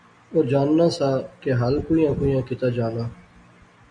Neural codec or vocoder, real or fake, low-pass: none; real; 9.9 kHz